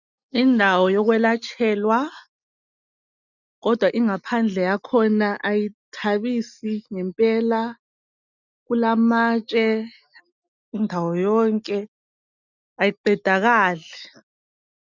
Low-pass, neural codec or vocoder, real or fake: 7.2 kHz; none; real